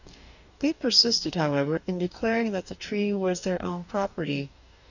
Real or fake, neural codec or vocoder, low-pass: fake; codec, 44.1 kHz, 2.6 kbps, DAC; 7.2 kHz